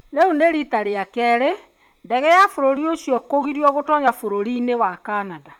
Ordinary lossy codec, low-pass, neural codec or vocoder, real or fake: none; 19.8 kHz; vocoder, 44.1 kHz, 128 mel bands, Pupu-Vocoder; fake